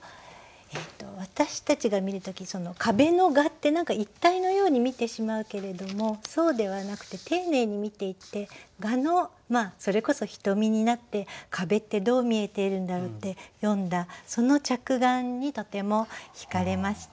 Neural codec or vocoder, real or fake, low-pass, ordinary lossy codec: none; real; none; none